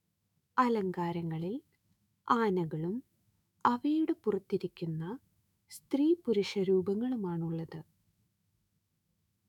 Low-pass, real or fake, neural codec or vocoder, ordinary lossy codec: 19.8 kHz; fake; autoencoder, 48 kHz, 128 numbers a frame, DAC-VAE, trained on Japanese speech; none